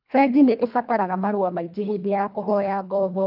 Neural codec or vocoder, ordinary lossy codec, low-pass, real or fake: codec, 24 kHz, 1.5 kbps, HILCodec; none; 5.4 kHz; fake